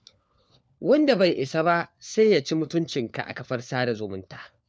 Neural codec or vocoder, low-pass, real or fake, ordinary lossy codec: codec, 16 kHz, 4 kbps, FunCodec, trained on LibriTTS, 50 frames a second; none; fake; none